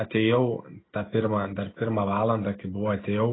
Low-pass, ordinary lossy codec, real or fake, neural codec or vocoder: 7.2 kHz; AAC, 16 kbps; real; none